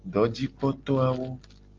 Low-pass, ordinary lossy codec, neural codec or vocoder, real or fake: 7.2 kHz; Opus, 32 kbps; none; real